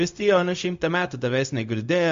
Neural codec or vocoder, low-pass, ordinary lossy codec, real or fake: codec, 16 kHz, 0.4 kbps, LongCat-Audio-Codec; 7.2 kHz; MP3, 48 kbps; fake